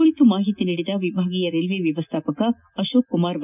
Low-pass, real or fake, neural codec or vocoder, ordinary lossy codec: 3.6 kHz; real; none; none